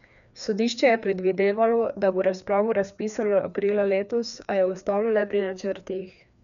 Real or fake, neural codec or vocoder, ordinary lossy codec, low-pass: fake; codec, 16 kHz, 2 kbps, FreqCodec, larger model; none; 7.2 kHz